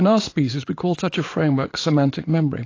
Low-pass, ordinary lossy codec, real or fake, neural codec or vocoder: 7.2 kHz; AAC, 32 kbps; fake; vocoder, 44.1 kHz, 80 mel bands, Vocos